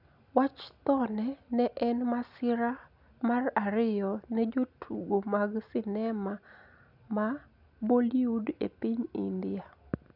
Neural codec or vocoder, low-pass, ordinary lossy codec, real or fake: none; 5.4 kHz; none; real